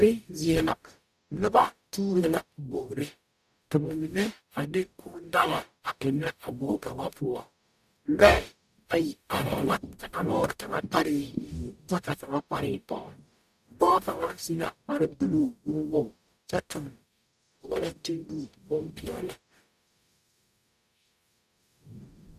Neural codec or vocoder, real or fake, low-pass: codec, 44.1 kHz, 0.9 kbps, DAC; fake; 14.4 kHz